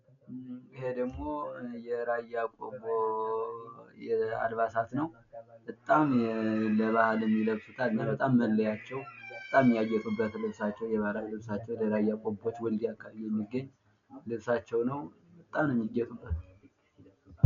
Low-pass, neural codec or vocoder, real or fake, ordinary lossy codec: 7.2 kHz; none; real; MP3, 96 kbps